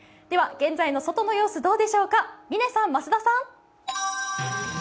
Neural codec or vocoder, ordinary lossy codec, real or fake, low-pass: none; none; real; none